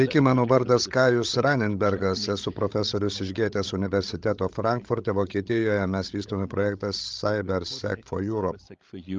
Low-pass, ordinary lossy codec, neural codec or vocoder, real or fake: 7.2 kHz; Opus, 32 kbps; codec, 16 kHz, 16 kbps, FreqCodec, larger model; fake